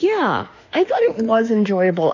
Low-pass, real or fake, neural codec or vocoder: 7.2 kHz; fake; autoencoder, 48 kHz, 32 numbers a frame, DAC-VAE, trained on Japanese speech